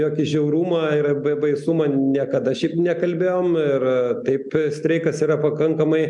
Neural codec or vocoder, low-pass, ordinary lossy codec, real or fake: none; 10.8 kHz; MP3, 96 kbps; real